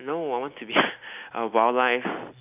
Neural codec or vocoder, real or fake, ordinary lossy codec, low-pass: none; real; none; 3.6 kHz